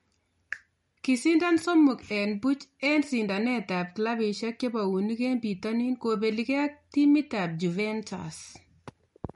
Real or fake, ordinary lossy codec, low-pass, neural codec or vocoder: real; MP3, 48 kbps; 19.8 kHz; none